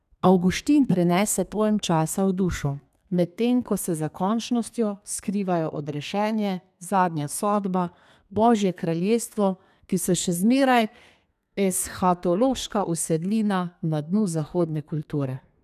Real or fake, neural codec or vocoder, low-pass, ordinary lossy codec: fake; codec, 32 kHz, 1.9 kbps, SNAC; 14.4 kHz; none